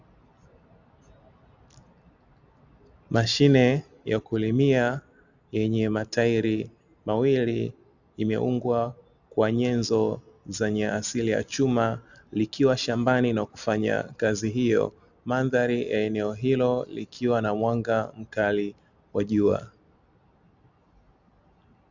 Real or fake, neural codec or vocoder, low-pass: real; none; 7.2 kHz